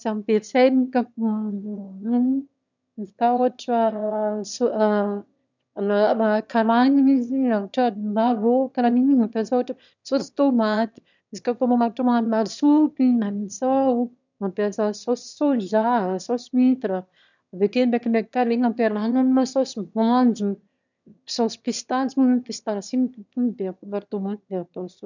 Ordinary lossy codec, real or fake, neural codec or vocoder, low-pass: none; fake; autoencoder, 22.05 kHz, a latent of 192 numbers a frame, VITS, trained on one speaker; 7.2 kHz